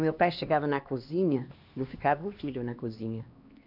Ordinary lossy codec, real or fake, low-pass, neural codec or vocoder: none; fake; 5.4 kHz; codec, 16 kHz, 2 kbps, X-Codec, HuBERT features, trained on LibriSpeech